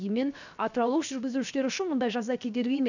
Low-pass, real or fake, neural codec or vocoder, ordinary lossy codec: 7.2 kHz; fake; codec, 16 kHz, 0.7 kbps, FocalCodec; none